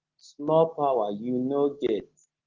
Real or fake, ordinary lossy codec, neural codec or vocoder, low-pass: real; Opus, 16 kbps; none; 7.2 kHz